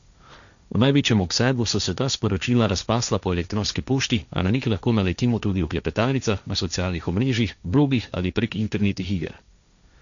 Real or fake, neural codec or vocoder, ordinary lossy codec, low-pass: fake; codec, 16 kHz, 1.1 kbps, Voila-Tokenizer; none; 7.2 kHz